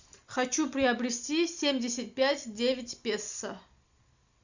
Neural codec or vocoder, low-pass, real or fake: none; 7.2 kHz; real